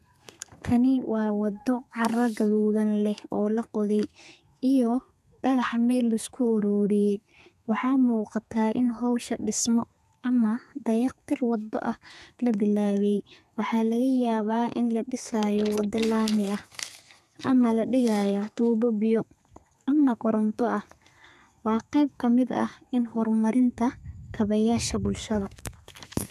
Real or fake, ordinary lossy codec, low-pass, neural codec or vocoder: fake; none; 14.4 kHz; codec, 32 kHz, 1.9 kbps, SNAC